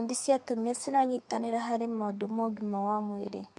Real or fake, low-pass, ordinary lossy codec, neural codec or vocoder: fake; 10.8 kHz; MP3, 64 kbps; codec, 32 kHz, 1.9 kbps, SNAC